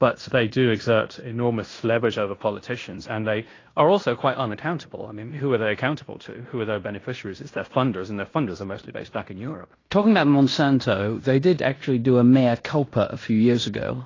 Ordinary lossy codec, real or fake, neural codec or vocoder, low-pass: AAC, 32 kbps; fake; codec, 16 kHz in and 24 kHz out, 0.9 kbps, LongCat-Audio-Codec, fine tuned four codebook decoder; 7.2 kHz